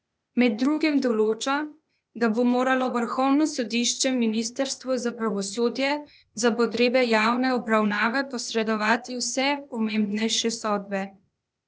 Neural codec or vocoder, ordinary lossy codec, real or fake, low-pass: codec, 16 kHz, 0.8 kbps, ZipCodec; none; fake; none